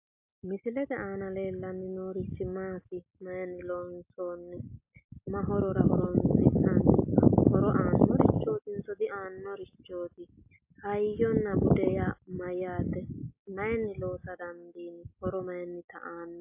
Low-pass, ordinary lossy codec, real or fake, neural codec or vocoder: 3.6 kHz; AAC, 32 kbps; real; none